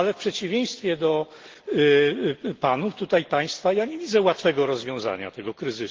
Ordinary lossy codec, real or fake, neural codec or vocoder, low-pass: Opus, 16 kbps; real; none; 7.2 kHz